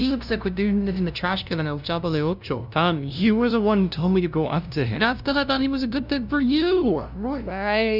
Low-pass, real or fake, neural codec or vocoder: 5.4 kHz; fake; codec, 16 kHz, 0.5 kbps, FunCodec, trained on LibriTTS, 25 frames a second